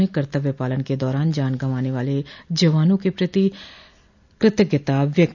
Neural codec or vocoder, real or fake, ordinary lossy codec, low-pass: none; real; none; 7.2 kHz